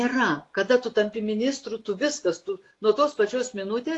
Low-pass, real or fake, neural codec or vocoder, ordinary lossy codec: 10.8 kHz; real; none; AAC, 48 kbps